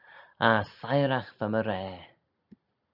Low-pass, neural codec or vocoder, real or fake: 5.4 kHz; none; real